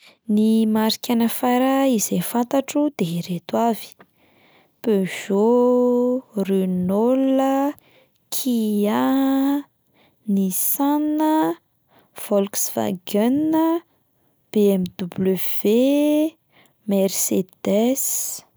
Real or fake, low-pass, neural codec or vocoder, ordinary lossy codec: real; none; none; none